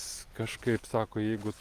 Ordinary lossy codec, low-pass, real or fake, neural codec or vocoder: Opus, 32 kbps; 14.4 kHz; real; none